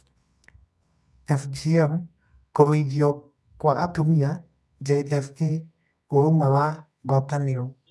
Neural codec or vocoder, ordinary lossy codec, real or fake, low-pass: codec, 24 kHz, 0.9 kbps, WavTokenizer, medium music audio release; none; fake; none